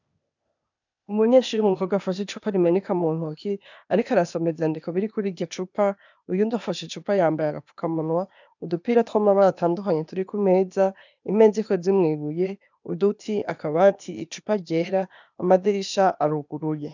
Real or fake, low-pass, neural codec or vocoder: fake; 7.2 kHz; codec, 16 kHz, 0.8 kbps, ZipCodec